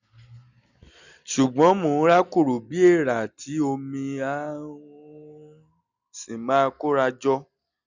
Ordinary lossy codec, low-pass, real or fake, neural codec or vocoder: none; 7.2 kHz; real; none